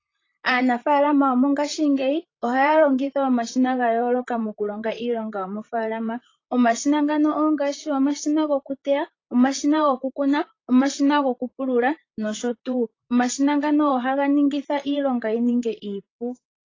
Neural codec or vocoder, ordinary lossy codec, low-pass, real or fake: vocoder, 44.1 kHz, 128 mel bands, Pupu-Vocoder; AAC, 32 kbps; 7.2 kHz; fake